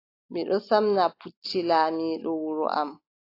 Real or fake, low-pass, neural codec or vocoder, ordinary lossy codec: real; 5.4 kHz; none; AAC, 32 kbps